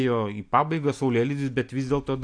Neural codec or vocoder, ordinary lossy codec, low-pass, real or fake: none; AAC, 64 kbps; 9.9 kHz; real